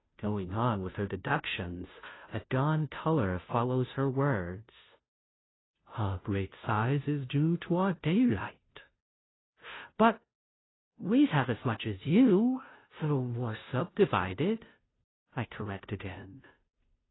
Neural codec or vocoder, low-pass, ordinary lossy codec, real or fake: codec, 16 kHz, 0.5 kbps, FunCodec, trained on Chinese and English, 25 frames a second; 7.2 kHz; AAC, 16 kbps; fake